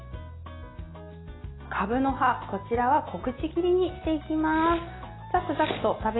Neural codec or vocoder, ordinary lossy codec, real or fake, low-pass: none; AAC, 16 kbps; real; 7.2 kHz